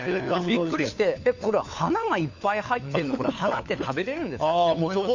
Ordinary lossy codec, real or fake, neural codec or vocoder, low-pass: none; fake; codec, 16 kHz, 4 kbps, FunCodec, trained on LibriTTS, 50 frames a second; 7.2 kHz